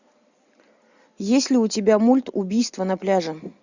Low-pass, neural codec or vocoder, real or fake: 7.2 kHz; none; real